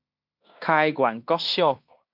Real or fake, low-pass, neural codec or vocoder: fake; 5.4 kHz; codec, 16 kHz in and 24 kHz out, 0.9 kbps, LongCat-Audio-Codec, fine tuned four codebook decoder